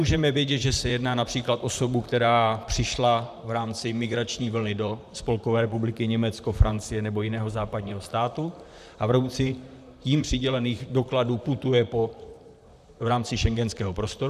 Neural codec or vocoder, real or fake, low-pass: vocoder, 44.1 kHz, 128 mel bands, Pupu-Vocoder; fake; 14.4 kHz